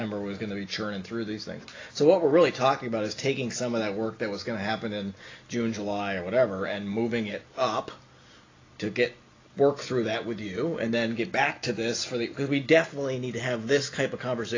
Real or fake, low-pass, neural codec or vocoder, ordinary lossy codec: real; 7.2 kHz; none; AAC, 32 kbps